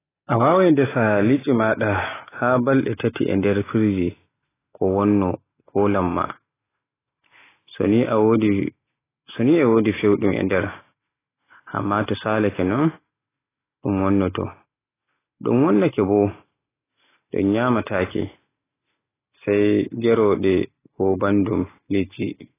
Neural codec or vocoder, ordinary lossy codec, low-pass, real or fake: none; AAC, 16 kbps; 3.6 kHz; real